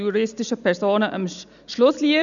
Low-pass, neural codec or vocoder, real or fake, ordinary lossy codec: 7.2 kHz; none; real; none